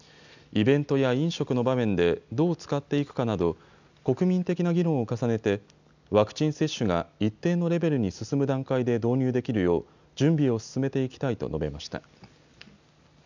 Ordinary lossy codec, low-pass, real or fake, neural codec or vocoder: none; 7.2 kHz; real; none